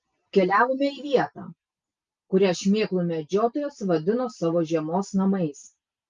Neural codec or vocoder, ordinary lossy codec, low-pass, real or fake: none; Opus, 32 kbps; 7.2 kHz; real